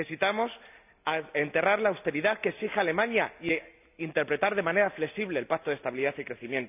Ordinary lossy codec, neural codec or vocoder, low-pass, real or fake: none; none; 3.6 kHz; real